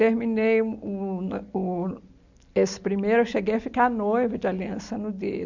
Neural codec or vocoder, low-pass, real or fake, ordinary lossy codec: none; 7.2 kHz; real; none